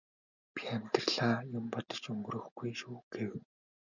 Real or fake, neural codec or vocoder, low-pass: real; none; 7.2 kHz